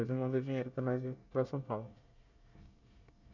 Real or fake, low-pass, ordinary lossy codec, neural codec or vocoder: fake; 7.2 kHz; none; codec, 24 kHz, 1 kbps, SNAC